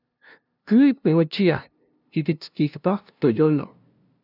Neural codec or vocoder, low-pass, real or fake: codec, 16 kHz, 0.5 kbps, FunCodec, trained on LibriTTS, 25 frames a second; 5.4 kHz; fake